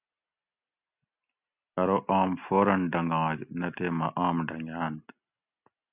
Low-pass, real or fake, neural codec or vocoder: 3.6 kHz; real; none